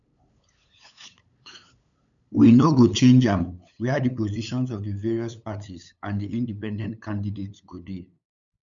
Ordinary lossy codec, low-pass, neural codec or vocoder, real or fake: none; 7.2 kHz; codec, 16 kHz, 8 kbps, FunCodec, trained on LibriTTS, 25 frames a second; fake